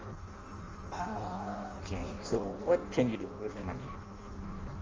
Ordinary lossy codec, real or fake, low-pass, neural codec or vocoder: Opus, 32 kbps; fake; 7.2 kHz; codec, 16 kHz in and 24 kHz out, 0.6 kbps, FireRedTTS-2 codec